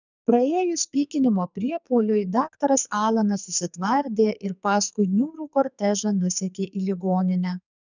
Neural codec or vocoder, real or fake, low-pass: codec, 44.1 kHz, 2.6 kbps, SNAC; fake; 7.2 kHz